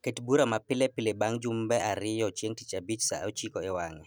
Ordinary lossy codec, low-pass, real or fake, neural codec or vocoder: none; none; real; none